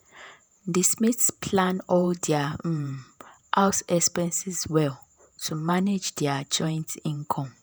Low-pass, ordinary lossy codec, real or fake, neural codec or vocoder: none; none; fake; vocoder, 48 kHz, 128 mel bands, Vocos